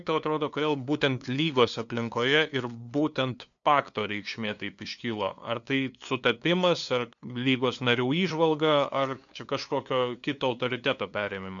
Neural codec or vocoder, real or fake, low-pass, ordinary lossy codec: codec, 16 kHz, 2 kbps, FunCodec, trained on LibriTTS, 25 frames a second; fake; 7.2 kHz; MP3, 96 kbps